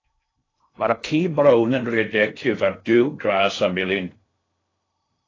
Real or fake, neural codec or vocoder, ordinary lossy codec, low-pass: fake; codec, 16 kHz in and 24 kHz out, 0.6 kbps, FocalCodec, streaming, 2048 codes; AAC, 32 kbps; 7.2 kHz